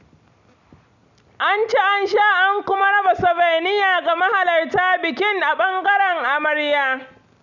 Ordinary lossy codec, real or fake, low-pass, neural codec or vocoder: none; real; 7.2 kHz; none